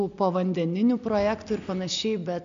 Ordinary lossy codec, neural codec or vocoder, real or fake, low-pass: MP3, 64 kbps; none; real; 7.2 kHz